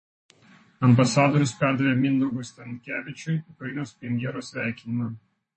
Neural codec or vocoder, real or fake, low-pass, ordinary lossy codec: vocoder, 44.1 kHz, 128 mel bands, Pupu-Vocoder; fake; 10.8 kHz; MP3, 32 kbps